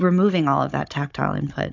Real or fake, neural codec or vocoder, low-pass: fake; codec, 16 kHz, 16 kbps, FunCodec, trained on Chinese and English, 50 frames a second; 7.2 kHz